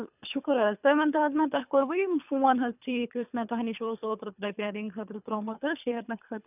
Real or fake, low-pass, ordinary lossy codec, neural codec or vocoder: fake; 3.6 kHz; none; codec, 24 kHz, 3 kbps, HILCodec